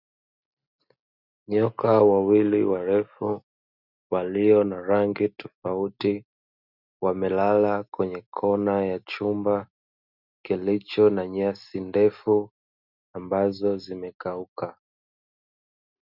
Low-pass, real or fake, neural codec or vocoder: 5.4 kHz; real; none